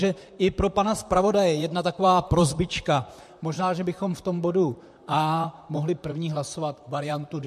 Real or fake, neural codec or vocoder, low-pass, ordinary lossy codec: fake; vocoder, 44.1 kHz, 128 mel bands, Pupu-Vocoder; 14.4 kHz; MP3, 64 kbps